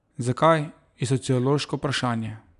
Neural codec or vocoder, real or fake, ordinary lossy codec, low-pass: none; real; none; 10.8 kHz